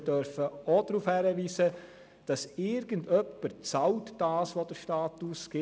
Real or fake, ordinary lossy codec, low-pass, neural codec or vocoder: real; none; none; none